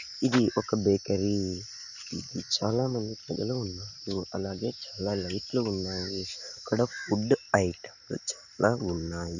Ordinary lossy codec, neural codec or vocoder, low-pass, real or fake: none; none; 7.2 kHz; real